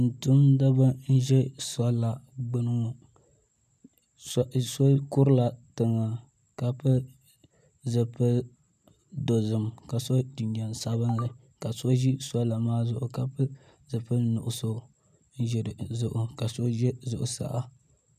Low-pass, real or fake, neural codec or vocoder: 14.4 kHz; real; none